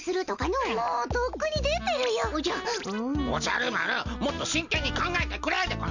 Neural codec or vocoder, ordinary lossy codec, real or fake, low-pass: none; none; real; 7.2 kHz